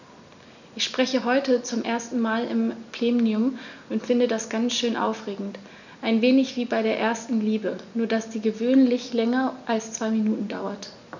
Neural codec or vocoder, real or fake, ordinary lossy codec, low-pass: none; real; none; 7.2 kHz